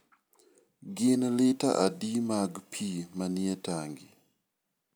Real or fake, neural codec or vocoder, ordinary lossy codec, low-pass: real; none; none; none